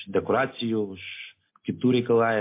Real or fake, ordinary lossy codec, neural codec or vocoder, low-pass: real; MP3, 24 kbps; none; 3.6 kHz